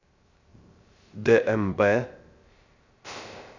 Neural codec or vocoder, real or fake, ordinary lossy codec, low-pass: codec, 16 kHz, 0.3 kbps, FocalCodec; fake; Opus, 64 kbps; 7.2 kHz